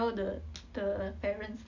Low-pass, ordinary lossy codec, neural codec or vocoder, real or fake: 7.2 kHz; none; none; real